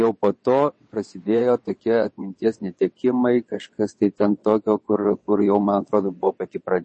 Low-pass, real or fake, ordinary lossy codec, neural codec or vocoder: 9.9 kHz; fake; MP3, 32 kbps; vocoder, 22.05 kHz, 80 mel bands, WaveNeXt